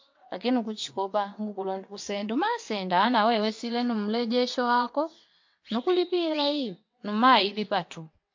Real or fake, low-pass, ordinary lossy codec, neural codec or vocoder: fake; 7.2 kHz; MP3, 48 kbps; vocoder, 22.05 kHz, 80 mel bands, WaveNeXt